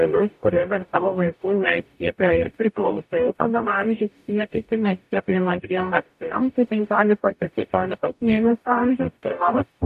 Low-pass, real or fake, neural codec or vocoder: 14.4 kHz; fake; codec, 44.1 kHz, 0.9 kbps, DAC